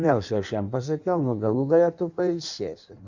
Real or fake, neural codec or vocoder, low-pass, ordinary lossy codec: fake; codec, 16 kHz in and 24 kHz out, 1.1 kbps, FireRedTTS-2 codec; 7.2 kHz; Opus, 64 kbps